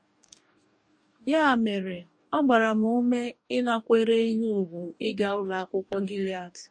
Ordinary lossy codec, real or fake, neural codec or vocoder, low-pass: MP3, 48 kbps; fake; codec, 44.1 kHz, 2.6 kbps, DAC; 9.9 kHz